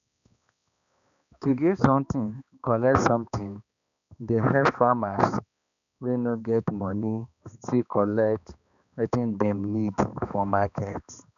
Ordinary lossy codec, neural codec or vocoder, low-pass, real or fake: none; codec, 16 kHz, 2 kbps, X-Codec, HuBERT features, trained on balanced general audio; 7.2 kHz; fake